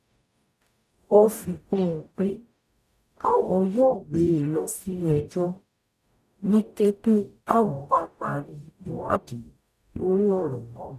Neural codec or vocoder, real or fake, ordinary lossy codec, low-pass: codec, 44.1 kHz, 0.9 kbps, DAC; fake; none; 14.4 kHz